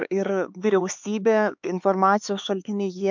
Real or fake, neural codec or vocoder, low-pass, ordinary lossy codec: fake; codec, 16 kHz, 4 kbps, X-Codec, HuBERT features, trained on LibriSpeech; 7.2 kHz; MP3, 64 kbps